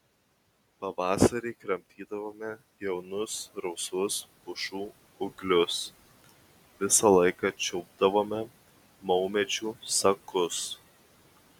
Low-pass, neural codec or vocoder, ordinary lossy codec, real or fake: 19.8 kHz; none; MP3, 96 kbps; real